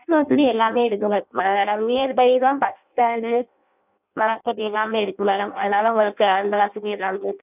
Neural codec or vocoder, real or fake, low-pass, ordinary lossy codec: codec, 16 kHz in and 24 kHz out, 0.6 kbps, FireRedTTS-2 codec; fake; 3.6 kHz; none